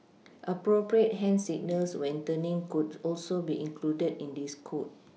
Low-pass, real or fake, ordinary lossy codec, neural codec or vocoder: none; real; none; none